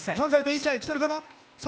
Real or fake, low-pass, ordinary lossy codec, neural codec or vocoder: fake; none; none; codec, 16 kHz, 0.8 kbps, ZipCodec